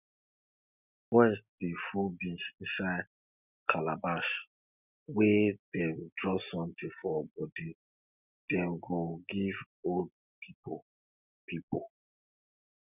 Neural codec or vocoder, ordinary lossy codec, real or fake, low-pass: none; none; real; 3.6 kHz